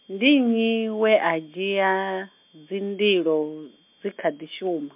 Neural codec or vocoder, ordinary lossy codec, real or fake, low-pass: none; MP3, 32 kbps; real; 3.6 kHz